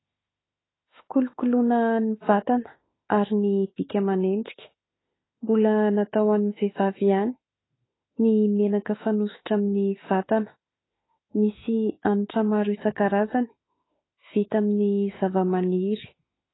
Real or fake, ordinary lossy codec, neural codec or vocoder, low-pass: fake; AAC, 16 kbps; autoencoder, 48 kHz, 32 numbers a frame, DAC-VAE, trained on Japanese speech; 7.2 kHz